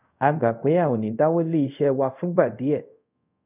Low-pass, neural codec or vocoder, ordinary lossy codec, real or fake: 3.6 kHz; codec, 24 kHz, 0.5 kbps, DualCodec; none; fake